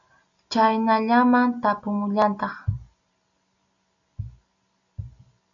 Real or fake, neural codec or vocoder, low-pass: real; none; 7.2 kHz